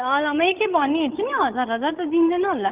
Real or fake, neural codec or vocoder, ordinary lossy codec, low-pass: fake; codec, 16 kHz, 16 kbps, FreqCodec, larger model; Opus, 32 kbps; 3.6 kHz